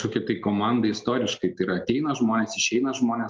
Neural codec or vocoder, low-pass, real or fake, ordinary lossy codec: none; 7.2 kHz; real; Opus, 32 kbps